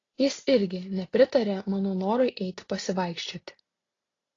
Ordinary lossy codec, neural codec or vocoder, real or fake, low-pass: AAC, 32 kbps; none; real; 7.2 kHz